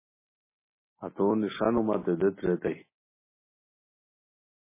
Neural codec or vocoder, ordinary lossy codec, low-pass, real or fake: none; MP3, 16 kbps; 3.6 kHz; real